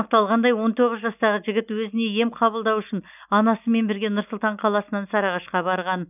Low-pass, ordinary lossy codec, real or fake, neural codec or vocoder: 3.6 kHz; none; real; none